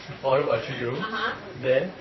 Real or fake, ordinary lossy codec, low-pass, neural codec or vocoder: fake; MP3, 24 kbps; 7.2 kHz; vocoder, 44.1 kHz, 128 mel bands, Pupu-Vocoder